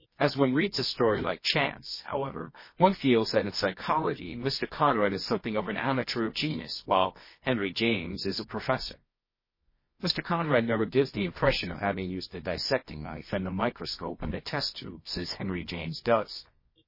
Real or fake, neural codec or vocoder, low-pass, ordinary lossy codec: fake; codec, 24 kHz, 0.9 kbps, WavTokenizer, medium music audio release; 5.4 kHz; MP3, 24 kbps